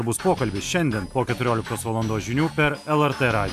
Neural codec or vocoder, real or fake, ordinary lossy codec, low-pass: none; real; AAC, 96 kbps; 14.4 kHz